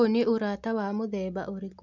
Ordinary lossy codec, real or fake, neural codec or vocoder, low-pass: none; real; none; 7.2 kHz